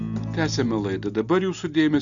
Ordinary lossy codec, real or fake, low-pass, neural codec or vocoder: Opus, 64 kbps; real; 7.2 kHz; none